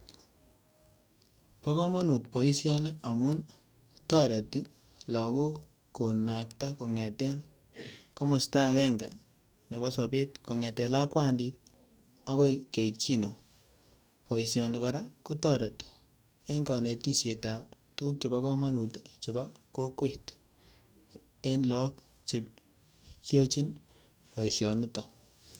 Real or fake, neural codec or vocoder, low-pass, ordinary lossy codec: fake; codec, 44.1 kHz, 2.6 kbps, DAC; none; none